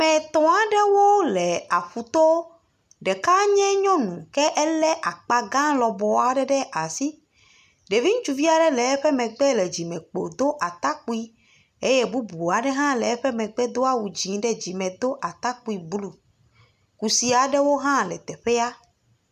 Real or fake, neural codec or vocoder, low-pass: real; none; 14.4 kHz